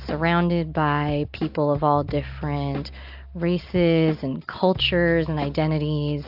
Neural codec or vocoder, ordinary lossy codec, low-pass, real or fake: none; AAC, 48 kbps; 5.4 kHz; real